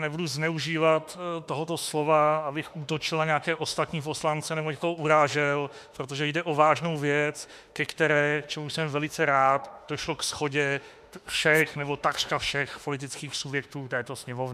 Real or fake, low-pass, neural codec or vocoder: fake; 14.4 kHz; autoencoder, 48 kHz, 32 numbers a frame, DAC-VAE, trained on Japanese speech